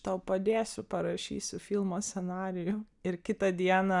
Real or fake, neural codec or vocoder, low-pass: real; none; 10.8 kHz